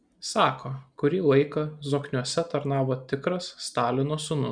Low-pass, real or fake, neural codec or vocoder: 9.9 kHz; real; none